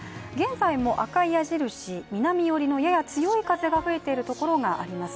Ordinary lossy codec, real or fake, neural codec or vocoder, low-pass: none; real; none; none